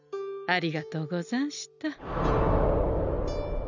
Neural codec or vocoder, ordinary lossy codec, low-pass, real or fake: none; none; 7.2 kHz; real